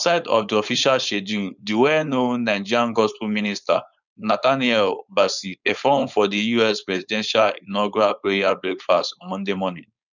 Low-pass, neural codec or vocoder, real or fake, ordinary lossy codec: 7.2 kHz; codec, 16 kHz, 4.8 kbps, FACodec; fake; none